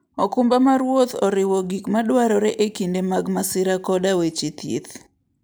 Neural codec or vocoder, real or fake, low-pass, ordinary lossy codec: none; real; none; none